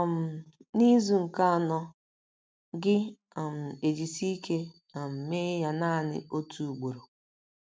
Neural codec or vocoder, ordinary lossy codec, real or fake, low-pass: none; none; real; none